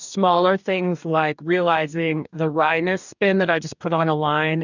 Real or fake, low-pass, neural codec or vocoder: fake; 7.2 kHz; codec, 44.1 kHz, 2.6 kbps, DAC